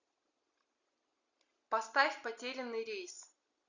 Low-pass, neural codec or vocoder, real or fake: 7.2 kHz; none; real